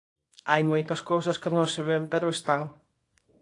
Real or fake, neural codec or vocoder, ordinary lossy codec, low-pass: fake; codec, 24 kHz, 0.9 kbps, WavTokenizer, small release; AAC, 48 kbps; 10.8 kHz